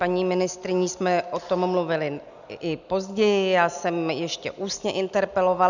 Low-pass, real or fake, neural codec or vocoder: 7.2 kHz; real; none